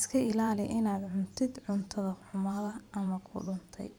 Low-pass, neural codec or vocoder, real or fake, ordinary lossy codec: none; vocoder, 44.1 kHz, 128 mel bands every 512 samples, BigVGAN v2; fake; none